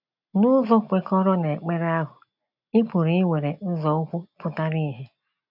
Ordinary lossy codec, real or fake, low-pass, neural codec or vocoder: none; real; 5.4 kHz; none